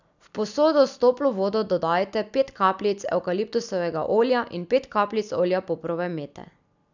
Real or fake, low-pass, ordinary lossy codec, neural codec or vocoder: real; 7.2 kHz; none; none